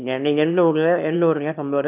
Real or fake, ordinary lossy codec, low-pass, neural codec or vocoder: fake; none; 3.6 kHz; autoencoder, 22.05 kHz, a latent of 192 numbers a frame, VITS, trained on one speaker